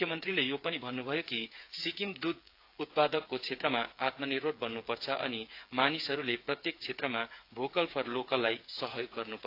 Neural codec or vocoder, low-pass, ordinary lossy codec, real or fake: codec, 16 kHz, 8 kbps, FreqCodec, smaller model; 5.4 kHz; AAC, 32 kbps; fake